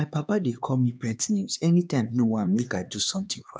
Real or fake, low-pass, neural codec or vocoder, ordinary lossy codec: fake; none; codec, 16 kHz, 2 kbps, X-Codec, HuBERT features, trained on LibriSpeech; none